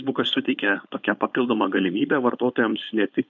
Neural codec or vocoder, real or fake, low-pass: codec, 16 kHz, 4.8 kbps, FACodec; fake; 7.2 kHz